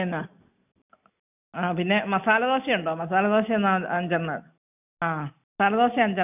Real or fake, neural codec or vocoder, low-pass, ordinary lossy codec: real; none; 3.6 kHz; none